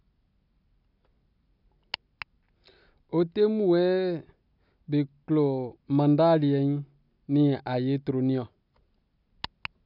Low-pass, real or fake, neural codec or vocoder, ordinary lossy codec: 5.4 kHz; real; none; none